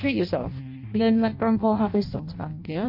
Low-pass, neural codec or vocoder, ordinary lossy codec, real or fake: 5.4 kHz; codec, 16 kHz in and 24 kHz out, 0.6 kbps, FireRedTTS-2 codec; MP3, 32 kbps; fake